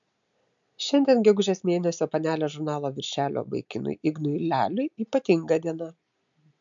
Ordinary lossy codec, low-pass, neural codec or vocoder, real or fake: MP3, 64 kbps; 7.2 kHz; none; real